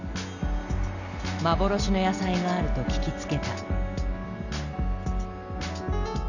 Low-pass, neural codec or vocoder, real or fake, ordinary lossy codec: 7.2 kHz; none; real; none